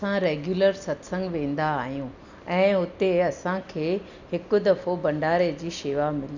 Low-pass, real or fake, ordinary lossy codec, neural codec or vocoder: 7.2 kHz; real; none; none